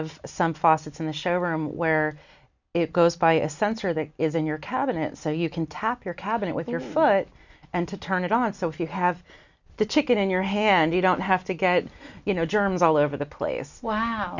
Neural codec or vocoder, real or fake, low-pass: none; real; 7.2 kHz